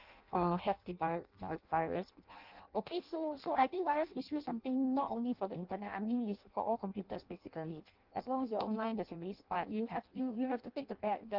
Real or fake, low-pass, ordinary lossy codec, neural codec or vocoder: fake; 5.4 kHz; Opus, 32 kbps; codec, 16 kHz in and 24 kHz out, 0.6 kbps, FireRedTTS-2 codec